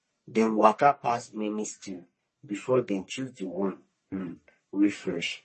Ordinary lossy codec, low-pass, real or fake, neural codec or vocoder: MP3, 32 kbps; 10.8 kHz; fake; codec, 44.1 kHz, 1.7 kbps, Pupu-Codec